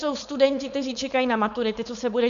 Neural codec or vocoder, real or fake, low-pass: codec, 16 kHz, 4.8 kbps, FACodec; fake; 7.2 kHz